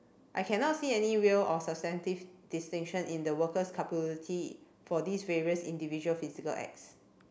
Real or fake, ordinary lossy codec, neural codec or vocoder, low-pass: real; none; none; none